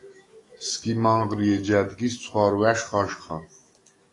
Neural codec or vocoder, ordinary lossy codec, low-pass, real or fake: autoencoder, 48 kHz, 128 numbers a frame, DAC-VAE, trained on Japanese speech; AAC, 32 kbps; 10.8 kHz; fake